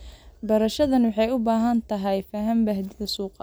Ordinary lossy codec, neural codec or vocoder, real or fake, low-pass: none; none; real; none